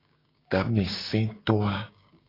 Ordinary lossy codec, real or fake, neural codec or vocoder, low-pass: AAC, 24 kbps; fake; codec, 16 kHz, 6 kbps, DAC; 5.4 kHz